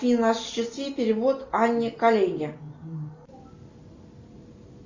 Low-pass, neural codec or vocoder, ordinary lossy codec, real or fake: 7.2 kHz; none; MP3, 64 kbps; real